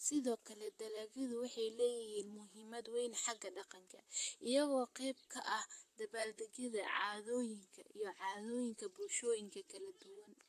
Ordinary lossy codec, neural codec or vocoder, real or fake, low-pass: AAC, 64 kbps; vocoder, 44.1 kHz, 128 mel bands, Pupu-Vocoder; fake; 14.4 kHz